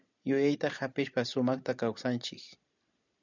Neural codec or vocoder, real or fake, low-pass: none; real; 7.2 kHz